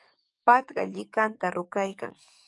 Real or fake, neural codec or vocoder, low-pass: fake; codec, 44.1 kHz, 7.8 kbps, DAC; 10.8 kHz